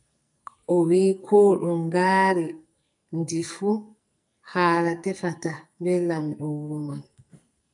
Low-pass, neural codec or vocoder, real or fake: 10.8 kHz; codec, 44.1 kHz, 2.6 kbps, SNAC; fake